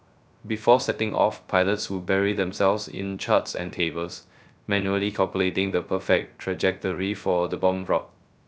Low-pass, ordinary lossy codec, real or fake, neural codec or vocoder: none; none; fake; codec, 16 kHz, 0.3 kbps, FocalCodec